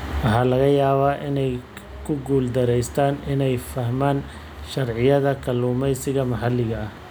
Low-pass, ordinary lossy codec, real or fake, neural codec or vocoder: none; none; real; none